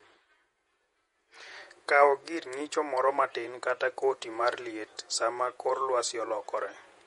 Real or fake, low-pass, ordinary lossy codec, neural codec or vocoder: fake; 19.8 kHz; MP3, 48 kbps; vocoder, 48 kHz, 128 mel bands, Vocos